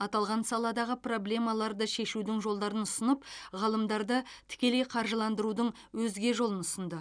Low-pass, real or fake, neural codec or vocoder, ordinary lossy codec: 9.9 kHz; real; none; none